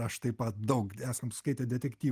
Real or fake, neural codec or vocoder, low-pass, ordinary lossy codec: real; none; 14.4 kHz; Opus, 24 kbps